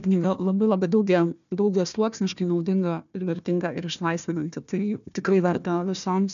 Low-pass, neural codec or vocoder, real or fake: 7.2 kHz; codec, 16 kHz, 1 kbps, FunCodec, trained on Chinese and English, 50 frames a second; fake